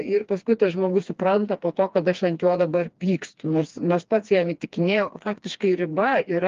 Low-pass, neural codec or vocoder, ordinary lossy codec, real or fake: 7.2 kHz; codec, 16 kHz, 2 kbps, FreqCodec, smaller model; Opus, 32 kbps; fake